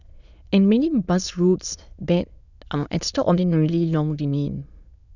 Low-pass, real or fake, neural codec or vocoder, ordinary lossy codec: 7.2 kHz; fake; autoencoder, 22.05 kHz, a latent of 192 numbers a frame, VITS, trained on many speakers; none